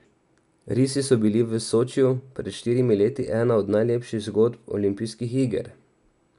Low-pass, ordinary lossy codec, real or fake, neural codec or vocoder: 10.8 kHz; none; real; none